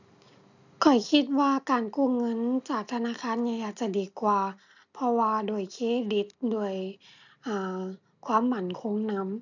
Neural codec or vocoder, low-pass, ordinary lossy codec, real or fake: none; 7.2 kHz; none; real